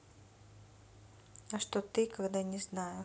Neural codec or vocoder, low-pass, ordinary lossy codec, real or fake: none; none; none; real